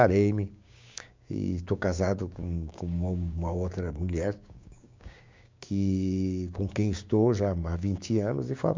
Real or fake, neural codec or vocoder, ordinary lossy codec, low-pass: fake; codec, 16 kHz, 6 kbps, DAC; MP3, 64 kbps; 7.2 kHz